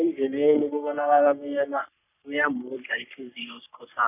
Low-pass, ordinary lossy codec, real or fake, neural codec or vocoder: 3.6 kHz; none; fake; codec, 44.1 kHz, 3.4 kbps, Pupu-Codec